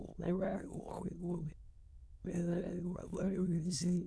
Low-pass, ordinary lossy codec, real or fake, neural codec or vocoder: none; none; fake; autoencoder, 22.05 kHz, a latent of 192 numbers a frame, VITS, trained on many speakers